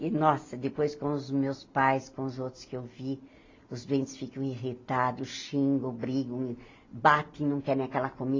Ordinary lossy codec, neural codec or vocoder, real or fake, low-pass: AAC, 32 kbps; none; real; 7.2 kHz